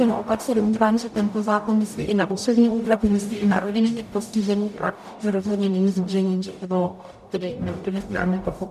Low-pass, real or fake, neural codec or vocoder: 14.4 kHz; fake; codec, 44.1 kHz, 0.9 kbps, DAC